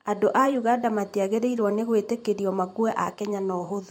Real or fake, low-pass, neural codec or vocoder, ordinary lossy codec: fake; 19.8 kHz; vocoder, 44.1 kHz, 128 mel bands every 512 samples, BigVGAN v2; MP3, 64 kbps